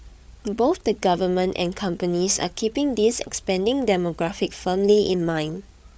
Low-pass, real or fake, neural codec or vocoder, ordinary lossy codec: none; fake; codec, 16 kHz, 8 kbps, FreqCodec, larger model; none